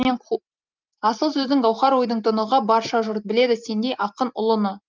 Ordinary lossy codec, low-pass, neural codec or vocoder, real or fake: Opus, 24 kbps; 7.2 kHz; none; real